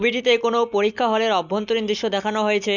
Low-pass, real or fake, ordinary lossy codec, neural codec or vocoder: 7.2 kHz; real; none; none